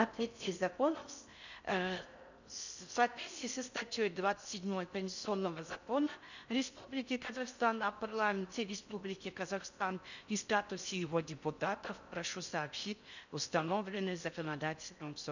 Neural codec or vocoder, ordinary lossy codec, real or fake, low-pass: codec, 16 kHz in and 24 kHz out, 0.6 kbps, FocalCodec, streaming, 4096 codes; none; fake; 7.2 kHz